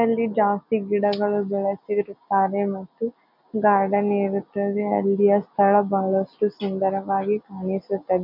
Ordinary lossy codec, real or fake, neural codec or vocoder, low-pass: none; real; none; 5.4 kHz